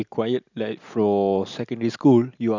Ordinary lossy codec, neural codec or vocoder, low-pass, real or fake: none; none; 7.2 kHz; real